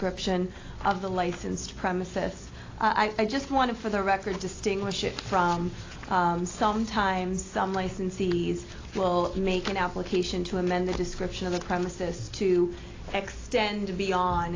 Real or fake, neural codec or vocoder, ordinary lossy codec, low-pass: real; none; AAC, 32 kbps; 7.2 kHz